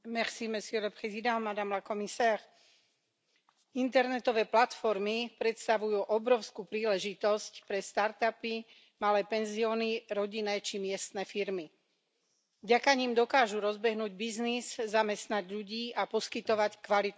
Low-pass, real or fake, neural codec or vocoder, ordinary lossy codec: none; real; none; none